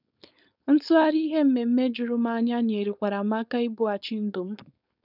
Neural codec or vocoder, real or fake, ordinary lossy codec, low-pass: codec, 16 kHz, 4.8 kbps, FACodec; fake; none; 5.4 kHz